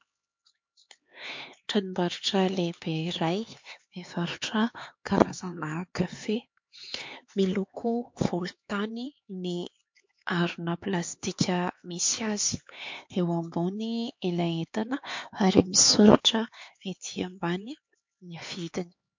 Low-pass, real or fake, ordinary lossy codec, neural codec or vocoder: 7.2 kHz; fake; MP3, 48 kbps; codec, 16 kHz, 4 kbps, X-Codec, HuBERT features, trained on LibriSpeech